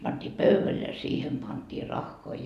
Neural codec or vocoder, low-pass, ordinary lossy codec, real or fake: none; 14.4 kHz; none; real